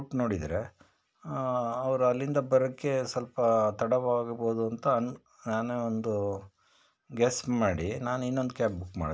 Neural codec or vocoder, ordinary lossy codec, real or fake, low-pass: none; none; real; none